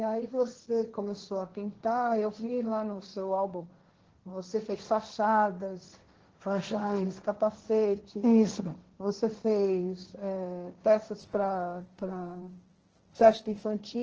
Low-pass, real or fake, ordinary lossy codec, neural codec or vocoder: 7.2 kHz; fake; Opus, 16 kbps; codec, 16 kHz, 1.1 kbps, Voila-Tokenizer